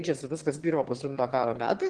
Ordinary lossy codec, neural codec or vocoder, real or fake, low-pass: Opus, 16 kbps; autoencoder, 22.05 kHz, a latent of 192 numbers a frame, VITS, trained on one speaker; fake; 9.9 kHz